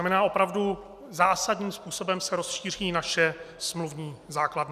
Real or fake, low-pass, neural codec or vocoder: real; 14.4 kHz; none